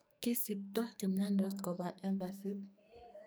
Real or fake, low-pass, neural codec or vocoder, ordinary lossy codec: fake; none; codec, 44.1 kHz, 2.6 kbps, SNAC; none